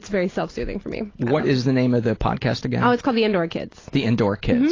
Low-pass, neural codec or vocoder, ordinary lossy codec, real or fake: 7.2 kHz; none; AAC, 32 kbps; real